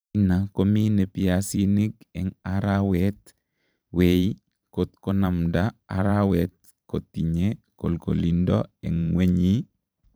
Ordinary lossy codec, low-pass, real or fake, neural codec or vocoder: none; none; fake; vocoder, 44.1 kHz, 128 mel bands every 512 samples, BigVGAN v2